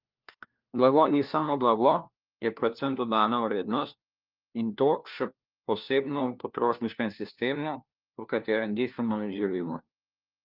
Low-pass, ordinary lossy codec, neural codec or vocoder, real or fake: 5.4 kHz; Opus, 24 kbps; codec, 16 kHz, 1 kbps, FunCodec, trained on LibriTTS, 50 frames a second; fake